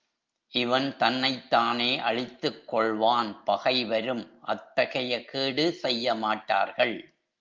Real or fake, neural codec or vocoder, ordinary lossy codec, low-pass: real; none; Opus, 24 kbps; 7.2 kHz